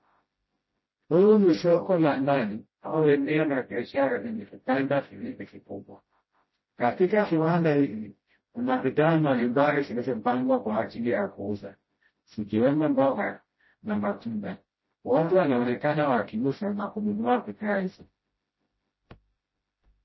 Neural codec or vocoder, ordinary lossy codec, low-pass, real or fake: codec, 16 kHz, 0.5 kbps, FreqCodec, smaller model; MP3, 24 kbps; 7.2 kHz; fake